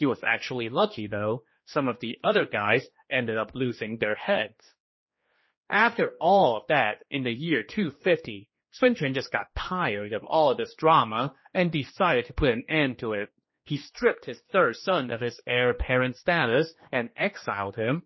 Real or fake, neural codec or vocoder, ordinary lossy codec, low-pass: fake; codec, 16 kHz, 2 kbps, X-Codec, HuBERT features, trained on general audio; MP3, 24 kbps; 7.2 kHz